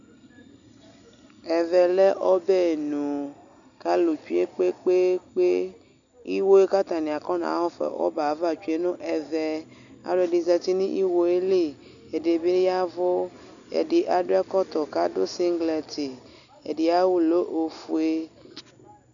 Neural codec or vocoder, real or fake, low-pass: none; real; 7.2 kHz